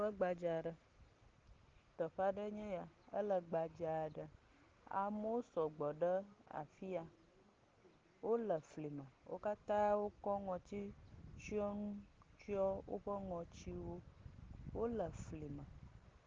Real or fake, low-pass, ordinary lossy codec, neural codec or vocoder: real; 7.2 kHz; Opus, 16 kbps; none